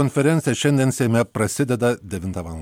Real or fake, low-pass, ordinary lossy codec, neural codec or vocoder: real; 19.8 kHz; MP3, 96 kbps; none